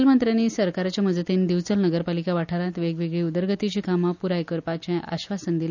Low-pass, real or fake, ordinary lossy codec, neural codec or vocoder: 7.2 kHz; real; none; none